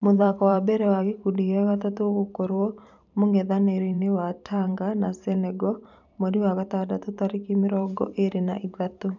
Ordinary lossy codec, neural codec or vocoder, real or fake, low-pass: none; vocoder, 44.1 kHz, 128 mel bands every 512 samples, BigVGAN v2; fake; 7.2 kHz